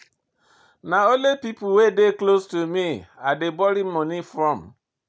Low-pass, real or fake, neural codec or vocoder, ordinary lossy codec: none; real; none; none